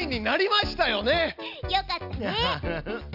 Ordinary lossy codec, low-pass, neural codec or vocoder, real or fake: none; 5.4 kHz; none; real